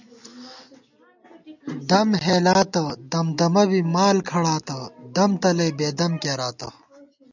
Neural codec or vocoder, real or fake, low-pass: none; real; 7.2 kHz